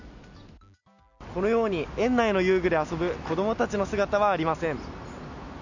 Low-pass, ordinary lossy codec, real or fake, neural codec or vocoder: 7.2 kHz; none; real; none